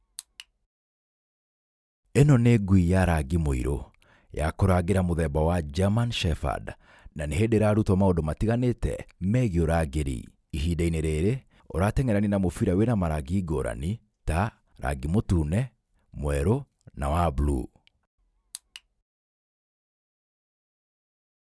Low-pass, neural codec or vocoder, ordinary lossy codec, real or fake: none; none; none; real